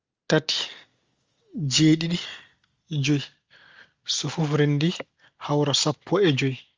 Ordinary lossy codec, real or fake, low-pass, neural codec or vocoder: Opus, 32 kbps; real; 7.2 kHz; none